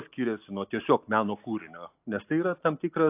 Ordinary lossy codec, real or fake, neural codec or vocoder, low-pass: AAC, 24 kbps; real; none; 3.6 kHz